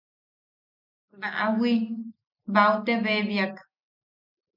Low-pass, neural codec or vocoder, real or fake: 5.4 kHz; none; real